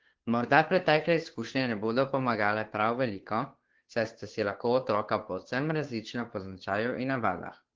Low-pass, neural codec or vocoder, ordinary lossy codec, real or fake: 7.2 kHz; codec, 16 kHz, 2 kbps, FunCodec, trained on Chinese and English, 25 frames a second; Opus, 16 kbps; fake